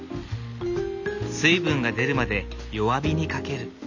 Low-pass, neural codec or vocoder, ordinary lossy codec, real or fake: 7.2 kHz; none; none; real